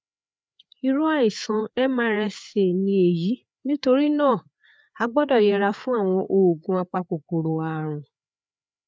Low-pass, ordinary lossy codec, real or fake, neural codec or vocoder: none; none; fake; codec, 16 kHz, 4 kbps, FreqCodec, larger model